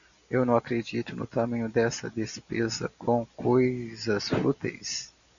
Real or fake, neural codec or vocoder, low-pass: real; none; 7.2 kHz